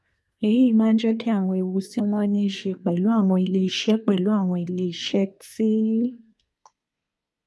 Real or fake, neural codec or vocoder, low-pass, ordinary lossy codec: fake; codec, 24 kHz, 1 kbps, SNAC; none; none